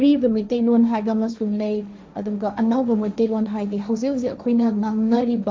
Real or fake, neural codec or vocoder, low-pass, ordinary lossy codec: fake; codec, 16 kHz, 1.1 kbps, Voila-Tokenizer; none; none